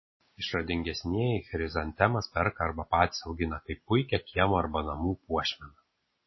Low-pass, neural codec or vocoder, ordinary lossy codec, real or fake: 7.2 kHz; none; MP3, 24 kbps; real